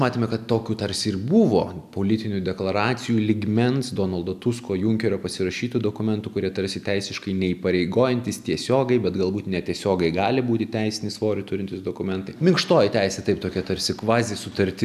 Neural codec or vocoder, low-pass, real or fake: none; 14.4 kHz; real